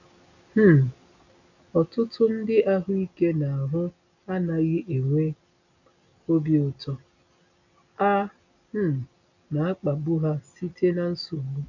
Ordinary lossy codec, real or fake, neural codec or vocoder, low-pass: none; real; none; 7.2 kHz